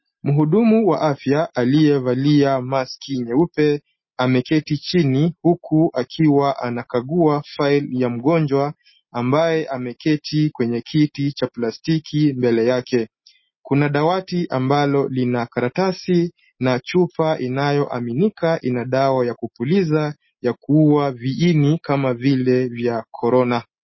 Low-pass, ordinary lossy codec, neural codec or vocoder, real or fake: 7.2 kHz; MP3, 24 kbps; none; real